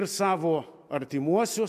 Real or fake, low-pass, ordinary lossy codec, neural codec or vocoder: real; 14.4 kHz; AAC, 96 kbps; none